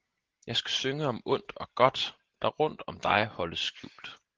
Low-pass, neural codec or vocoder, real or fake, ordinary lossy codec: 7.2 kHz; none; real; Opus, 16 kbps